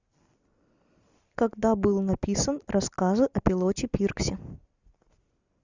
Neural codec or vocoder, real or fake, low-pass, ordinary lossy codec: none; real; 7.2 kHz; Opus, 64 kbps